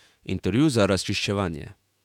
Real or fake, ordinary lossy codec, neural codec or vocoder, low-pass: fake; none; autoencoder, 48 kHz, 128 numbers a frame, DAC-VAE, trained on Japanese speech; 19.8 kHz